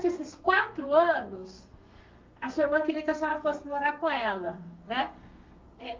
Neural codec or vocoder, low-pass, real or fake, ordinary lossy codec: codec, 32 kHz, 1.9 kbps, SNAC; 7.2 kHz; fake; Opus, 24 kbps